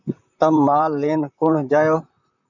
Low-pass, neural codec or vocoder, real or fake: 7.2 kHz; vocoder, 44.1 kHz, 128 mel bands, Pupu-Vocoder; fake